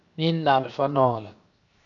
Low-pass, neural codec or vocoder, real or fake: 7.2 kHz; codec, 16 kHz, 0.7 kbps, FocalCodec; fake